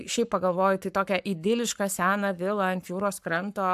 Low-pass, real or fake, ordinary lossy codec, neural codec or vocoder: 14.4 kHz; fake; AAC, 96 kbps; codec, 44.1 kHz, 7.8 kbps, Pupu-Codec